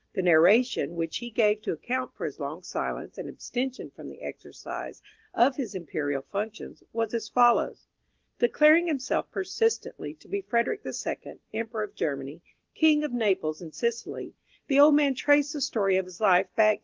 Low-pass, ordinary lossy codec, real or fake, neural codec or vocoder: 7.2 kHz; Opus, 16 kbps; real; none